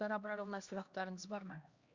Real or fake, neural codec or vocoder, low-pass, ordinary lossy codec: fake; codec, 16 kHz, 1 kbps, X-Codec, HuBERT features, trained on LibriSpeech; 7.2 kHz; Opus, 64 kbps